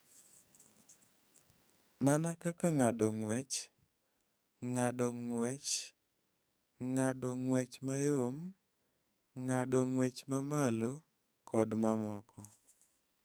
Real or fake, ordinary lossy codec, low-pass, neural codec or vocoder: fake; none; none; codec, 44.1 kHz, 2.6 kbps, SNAC